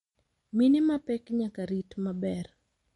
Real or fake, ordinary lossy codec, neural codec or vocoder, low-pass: real; MP3, 48 kbps; none; 19.8 kHz